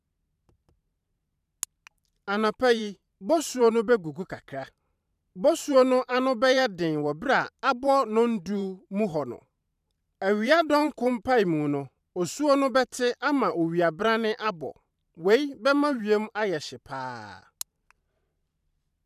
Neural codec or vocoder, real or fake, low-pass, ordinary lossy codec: vocoder, 44.1 kHz, 128 mel bands every 512 samples, BigVGAN v2; fake; 14.4 kHz; none